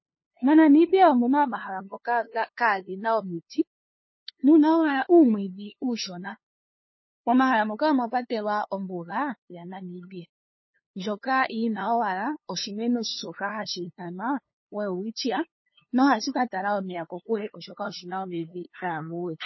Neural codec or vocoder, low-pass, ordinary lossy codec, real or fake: codec, 16 kHz, 2 kbps, FunCodec, trained on LibriTTS, 25 frames a second; 7.2 kHz; MP3, 24 kbps; fake